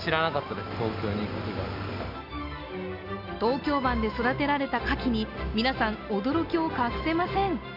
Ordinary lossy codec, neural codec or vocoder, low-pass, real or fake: none; none; 5.4 kHz; real